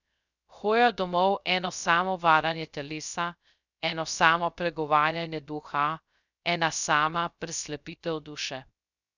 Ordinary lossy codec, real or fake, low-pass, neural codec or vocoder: none; fake; 7.2 kHz; codec, 16 kHz, 0.3 kbps, FocalCodec